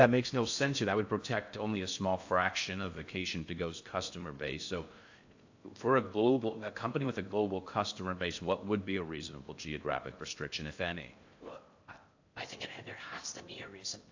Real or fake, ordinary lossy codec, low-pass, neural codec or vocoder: fake; AAC, 48 kbps; 7.2 kHz; codec, 16 kHz in and 24 kHz out, 0.6 kbps, FocalCodec, streaming, 4096 codes